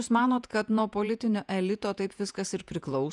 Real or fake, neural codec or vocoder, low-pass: fake; vocoder, 48 kHz, 128 mel bands, Vocos; 10.8 kHz